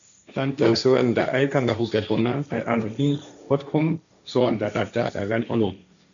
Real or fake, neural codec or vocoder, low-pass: fake; codec, 16 kHz, 1.1 kbps, Voila-Tokenizer; 7.2 kHz